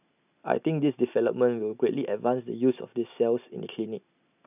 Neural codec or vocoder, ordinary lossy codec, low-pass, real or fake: none; none; 3.6 kHz; real